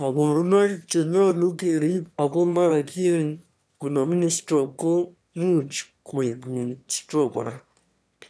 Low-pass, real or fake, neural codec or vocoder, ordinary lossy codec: none; fake; autoencoder, 22.05 kHz, a latent of 192 numbers a frame, VITS, trained on one speaker; none